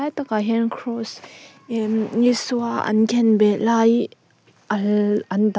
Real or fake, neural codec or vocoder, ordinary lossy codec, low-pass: real; none; none; none